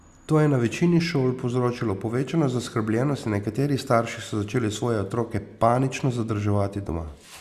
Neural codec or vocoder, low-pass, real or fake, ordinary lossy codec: none; 14.4 kHz; real; Opus, 64 kbps